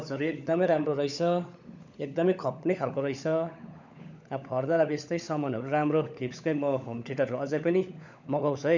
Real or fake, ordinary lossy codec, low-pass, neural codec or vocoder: fake; none; 7.2 kHz; codec, 16 kHz, 16 kbps, FunCodec, trained on LibriTTS, 50 frames a second